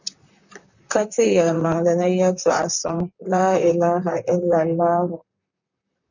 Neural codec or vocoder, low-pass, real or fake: vocoder, 44.1 kHz, 128 mel bands, Pupu-Vocoder; 7.2 kHz; fake